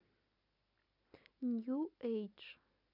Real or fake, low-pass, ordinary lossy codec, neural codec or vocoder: real; 5.4 kHz; none; none